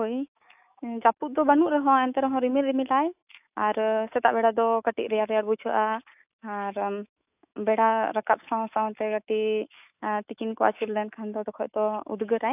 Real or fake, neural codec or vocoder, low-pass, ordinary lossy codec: fake; autoencoder, 48 kHz, 128 numbers a frame, DAC-VAE, trained on Japanese speech; 3.6 kHz; AAC, 32 kbps